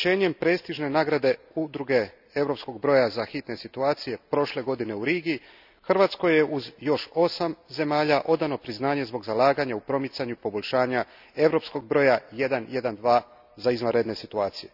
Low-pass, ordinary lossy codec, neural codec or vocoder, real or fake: 5.4 kHz; none; none; real